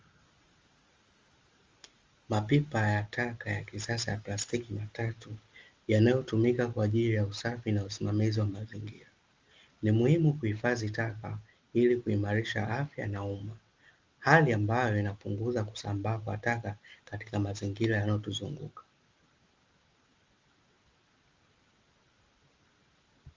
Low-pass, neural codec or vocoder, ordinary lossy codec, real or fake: 7.2 kHz; none; Opus, 32 kbps; real